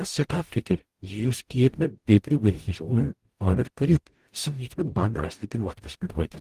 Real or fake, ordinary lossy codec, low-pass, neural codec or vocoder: fake; Opus, 24 kbps; 14.4 kHz; codec, 44.1 kHz, 0.9 kbps, DAC